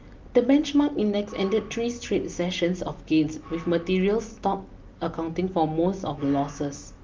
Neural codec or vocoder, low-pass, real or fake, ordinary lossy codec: none; 7.2 kHz; real; Opus, 32 kbps